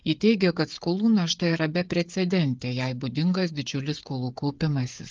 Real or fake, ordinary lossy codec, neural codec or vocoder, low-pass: fake; Opus, 32 kbps; codec, 16 kHz, 8 kbps, FreqCodec, smaller model; 7.2 kHz